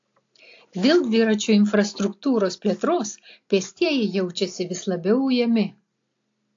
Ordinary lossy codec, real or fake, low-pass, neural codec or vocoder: AAC, 48 kbps; real; 7.2 kHz; none